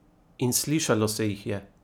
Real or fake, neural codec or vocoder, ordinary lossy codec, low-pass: real; none; none; none